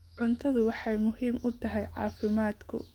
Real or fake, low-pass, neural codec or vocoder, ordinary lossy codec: fake; 19.8 kHz; autoencoder, 48 kHz, 128 numbers a frame, DAC-VAE, trained on Japanese speech; Opus, 32 kbps